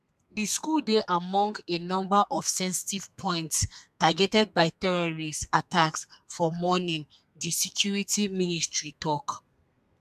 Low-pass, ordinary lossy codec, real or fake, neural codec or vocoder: 14.4 kHz; none; fake; codec, 32 kHz, 1.9 kbps, SNAC